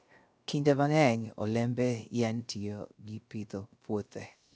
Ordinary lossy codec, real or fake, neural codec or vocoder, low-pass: none; fake; codec, 16 kHz, 0.3 kbps, FocalCodec; none